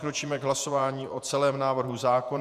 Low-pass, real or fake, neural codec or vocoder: 14.4 kHz; fake; vocoder, 48 kHz, 128 mel bands, Vocos